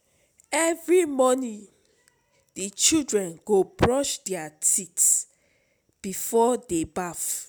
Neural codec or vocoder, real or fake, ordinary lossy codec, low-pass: none; real; none; none